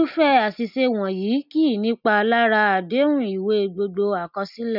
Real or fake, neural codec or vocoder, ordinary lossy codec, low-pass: real; none; none; 5.4 kHz